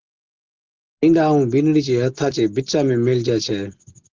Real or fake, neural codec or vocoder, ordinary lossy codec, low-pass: real; none; Opus, 16 kbps; 7.2 kHz